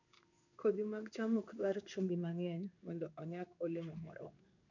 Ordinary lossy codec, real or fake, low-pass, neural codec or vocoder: none; fake; 7.2 kHz; codec, 16 kHz in and 24 kHz out, 1 kbps, XY-Tokenizer